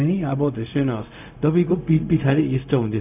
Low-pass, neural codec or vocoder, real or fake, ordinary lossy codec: 3.6 kHz; codec, 16 kHz, 0.4 kbps, LongCat-Audio-Codec; fake; none